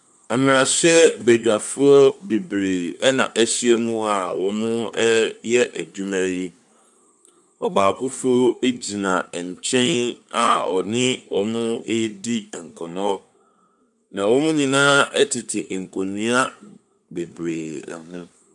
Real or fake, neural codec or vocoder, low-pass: fake; codec, 24 kHz, 1 kbps, SNAC; 10.8 kHz